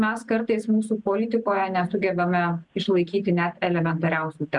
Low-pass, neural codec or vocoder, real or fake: 10.8 kHz; none; real